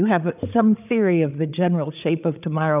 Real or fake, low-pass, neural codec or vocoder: fake; 3.6 kHz; codec, 16 kHz, 8 kbps, FreqCodec, larger model